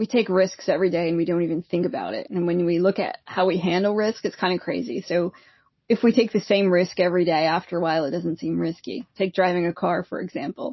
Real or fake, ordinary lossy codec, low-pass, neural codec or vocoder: real; MP3, 24 kbps; 7.2 kHz; none